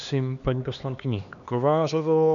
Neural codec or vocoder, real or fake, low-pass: codec, 16 kHz, 2 kbps, X-Codec, HuBERT features, trained on LibriSpeech; fake; 7.2 kHz